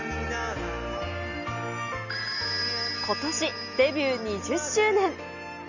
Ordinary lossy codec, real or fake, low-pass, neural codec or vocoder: none; real; 7.2 kHz; none